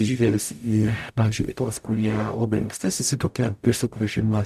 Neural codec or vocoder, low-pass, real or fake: codec, 44.1 kHz, 0.9 kbps, DAC; 14.4 kHz; fake